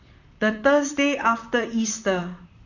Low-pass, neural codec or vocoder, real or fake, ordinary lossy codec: 7.2 kHz; vocoder, 44.1 kHz, 128 mel bands, Pupu-Vocoder; fake; none